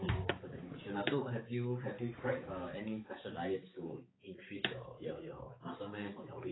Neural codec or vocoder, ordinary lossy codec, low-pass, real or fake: codec, 16 kHz, 4 kbps, X-Codec, HuBERT features, trained on balanced general audio; AAC, 16 kbps; 7.2 kHz; fake